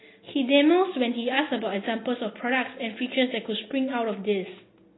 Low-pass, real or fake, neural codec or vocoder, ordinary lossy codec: 7.2 kHz; real; none; AAC, 16 kbps